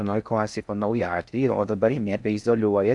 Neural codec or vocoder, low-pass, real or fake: codec, 16 kHz in and 24 kHz out, 0.6 kbps, FocalCodec, streaming, 4096 codes; 10.8 kHz; fake